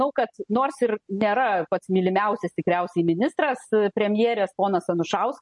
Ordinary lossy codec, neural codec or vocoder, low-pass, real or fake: MP3, 48 kbps; none; 10.8 kHz; real